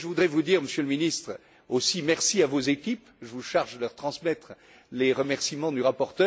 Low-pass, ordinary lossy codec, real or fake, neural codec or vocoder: none; none; real; none